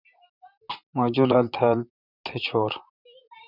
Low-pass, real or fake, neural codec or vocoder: 5.4 kHz; fake; vocoder, 44.1 kHz, 128 mel bands, Pupu-Vocoder